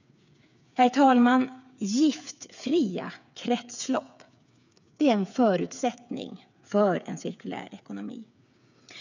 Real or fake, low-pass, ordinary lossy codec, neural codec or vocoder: fake; 7.2 kHz; none; codec, 16 kHz, 8 kbps, FreqCodec, smaller model